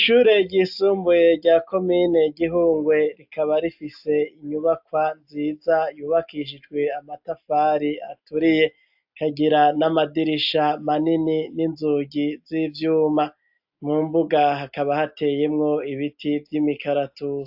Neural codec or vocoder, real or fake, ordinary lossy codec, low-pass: none; real; AAC, 48 kbps; 5.4 kHz